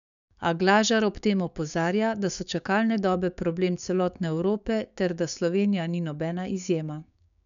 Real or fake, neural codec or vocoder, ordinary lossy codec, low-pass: fake; codec, 16 kHz, 6 kbps, DAC; none; 7.2 kHz